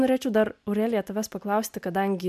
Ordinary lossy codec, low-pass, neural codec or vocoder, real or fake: MP3, 96 kbps; 14.4 kHz; none; real